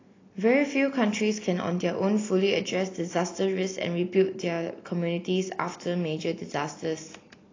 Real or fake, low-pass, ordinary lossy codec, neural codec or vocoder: real; 7.2 kHz; AAC, 32 kbps; none